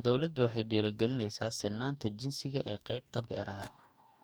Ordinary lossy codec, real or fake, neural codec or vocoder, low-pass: none; fake; codec, 44.1 kHz, 2.6 kbps, DAC; none